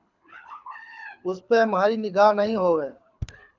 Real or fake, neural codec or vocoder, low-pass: fake; codec, 24 kHz, 6 kbps, HILCodec; 7.2 kHz